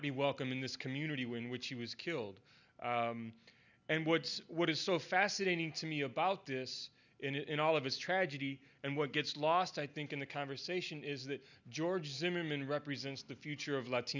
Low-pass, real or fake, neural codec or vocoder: 7.2 kHz; real; none